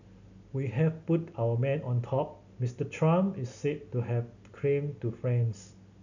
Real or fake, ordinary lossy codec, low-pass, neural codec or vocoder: real; none; 7.2 kHz; none